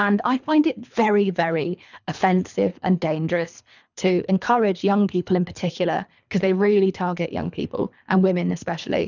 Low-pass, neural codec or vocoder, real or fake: 7.2 kHz; codec, 24 kHz, 3 kbps, HILCodec; fake